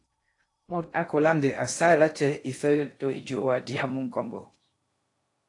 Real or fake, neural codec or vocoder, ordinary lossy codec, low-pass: fake; codec, 16 kHz in and 24 kHz out, 0.6 kbps, FocalCodec, streaming, 2048 codes; AAC, 48 kbps; 10.8 kHz